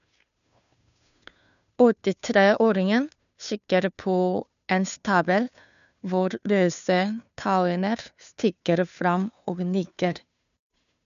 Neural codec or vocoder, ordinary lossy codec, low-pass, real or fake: codec, 16 kHz, 2 kbps, FunCodec, trained on Chinese and English, 25 frames a second; none; 7.2 kHz; fake